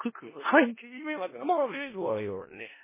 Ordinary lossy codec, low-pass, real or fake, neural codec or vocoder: MP3, 16 kbps; 3.6 kHz; fake; codec, 16 kHz in and 24 kHz out, 0.4 kbps, LongCat-Audio-Codec, four codebook decoder